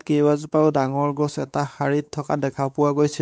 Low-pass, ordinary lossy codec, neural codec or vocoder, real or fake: none; none; codec, 16 kHz, 4 kbps, X-Codec, WavLM features, trained on Multilingual LibriSpeech; fake